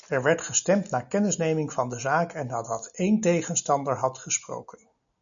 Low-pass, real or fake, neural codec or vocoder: 7.2 kHz; real; none